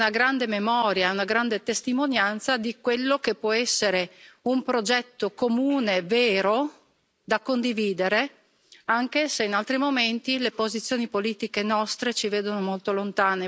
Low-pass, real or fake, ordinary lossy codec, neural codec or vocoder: none; real; none; none